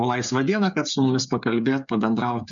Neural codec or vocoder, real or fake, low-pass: codec, 16 kHz, 8 kbps, FreqCodec, smaller model; fake; 7.2 kHz